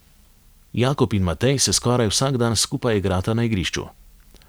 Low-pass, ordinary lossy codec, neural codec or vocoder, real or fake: none; none; none; real